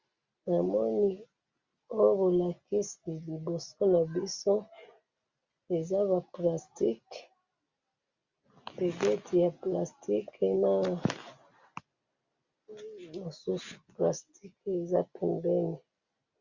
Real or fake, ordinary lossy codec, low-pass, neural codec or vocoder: real; Opus, 64 kbps; 7.2 kHz; none